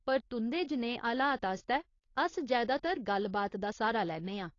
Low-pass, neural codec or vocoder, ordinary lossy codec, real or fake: 7.2 kHz; codec, 16 kHz, 4.8 kbps, FACodec; AAC, 32 kbps; fake